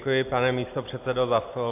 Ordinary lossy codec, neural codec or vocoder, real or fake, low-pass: AAC, 24 kbps; none; real; 3.6 kHz